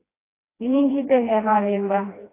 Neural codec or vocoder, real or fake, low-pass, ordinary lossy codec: codec, 16 kHz, 1 kbps, FreqCodec, smaller model; fake; 3.6 kHz; AAC, 24 kbps